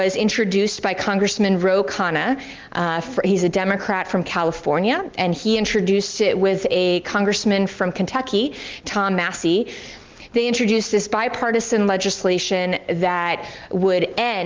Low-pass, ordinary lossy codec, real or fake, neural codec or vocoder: 7.2 kHz; Opus, 32 kbps; real; none